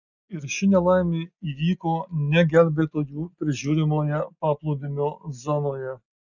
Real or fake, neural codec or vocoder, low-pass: real; none; 7.2 kHz